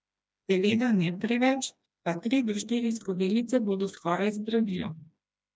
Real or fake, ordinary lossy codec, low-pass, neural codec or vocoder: fake; none; none; codec, 16 kHz, 1 kbps, FreqCodec, smaller model